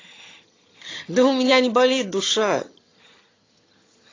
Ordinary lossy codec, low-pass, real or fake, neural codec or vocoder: AAC, 32 kbps; 7.2 kHz; fake; vocoder, 22.05 kHz, 80 mel bands, HiFi-GAN